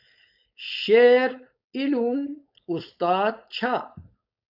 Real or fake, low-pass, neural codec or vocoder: fake; 5.4 kHz; codec, 16 kHz, 4.8 kbps, FACodec